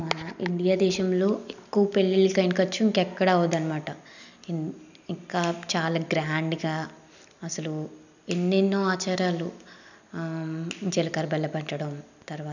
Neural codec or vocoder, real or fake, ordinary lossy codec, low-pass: none; real; none; 7.2 kHz